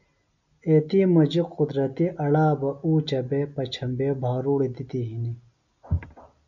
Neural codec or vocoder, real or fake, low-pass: none; real; 7.2 kHz